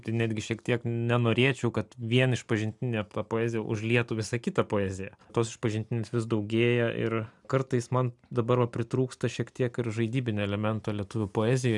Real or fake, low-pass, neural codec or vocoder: real; 10.8 kHz; none